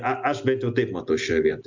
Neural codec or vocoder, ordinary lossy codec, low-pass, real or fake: none; MP3, 64 kbps; 7.2 kHz; real